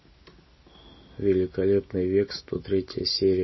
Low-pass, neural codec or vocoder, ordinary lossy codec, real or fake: 7.2 kHz; none; MP3, 24 kbps; real